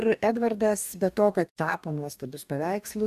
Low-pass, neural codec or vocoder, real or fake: 14.4 kHz; codec, 44.1 kHz, 2.6 kbps, DAC; fake